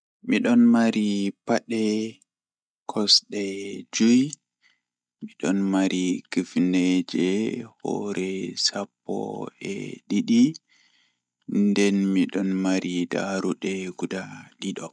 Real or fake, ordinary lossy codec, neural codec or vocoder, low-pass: real; none; none; 9.9 kHz